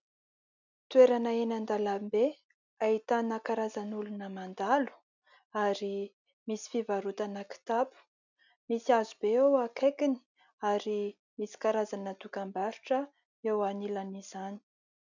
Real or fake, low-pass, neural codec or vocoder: real; 7.2 kHz; none